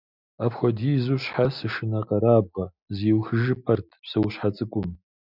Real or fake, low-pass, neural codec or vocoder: real; 5.4 kHz; none